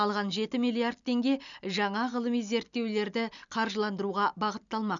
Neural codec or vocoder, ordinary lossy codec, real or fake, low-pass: none; none; real; 7.2 kHz